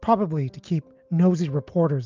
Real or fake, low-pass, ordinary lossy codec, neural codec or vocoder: real; 7.2 kHz; Opus, 24 kbps; none